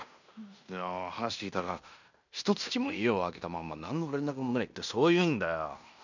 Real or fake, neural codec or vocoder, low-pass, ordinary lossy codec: fake; codec, 16 kHz in and 24 kHz out, 0.9 kbps, LongCat-Audio-Codec, fine tuned four codebook decoder; 7.2 kHz; none